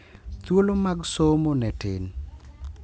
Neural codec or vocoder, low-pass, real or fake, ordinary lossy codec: none; none; real; none